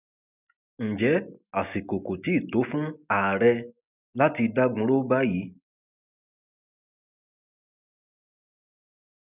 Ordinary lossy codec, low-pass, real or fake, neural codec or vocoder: none; 3.6 kHz; real; none